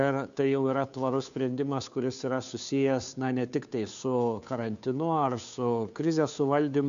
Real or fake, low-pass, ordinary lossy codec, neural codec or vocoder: fake; 7.2 kHz; AAC, 96 kbps; codec, 16 kHz, 2 kbps, FunCodec, trained on Chinese and English, 25 frames a second